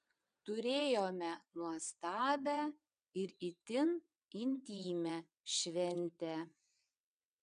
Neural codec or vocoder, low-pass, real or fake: vocoder, 22.05 kHz, 80 mel bands, WaveNeXt; 9.9 kHz; fake